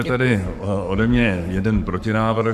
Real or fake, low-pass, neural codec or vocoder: fake; 14.4 kHz; codec, 44.1 kHz, 7.8 kbps, Pupu-Codec